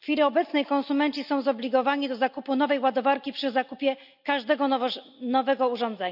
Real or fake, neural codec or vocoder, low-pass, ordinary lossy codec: real; none; 5.4 kHz; AAC, 48 kbps